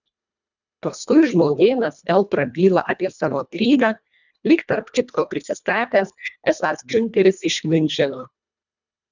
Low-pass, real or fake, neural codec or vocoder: 7.2 kHz; fake; codec, 24 kHz, 1.5 kbps, HILCodec